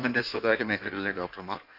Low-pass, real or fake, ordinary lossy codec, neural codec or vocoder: 5.4 kHz; fake; none; codec, 16 kHz in and 24 kHz out, 1.1 kbps, FireRedTTS-2 codec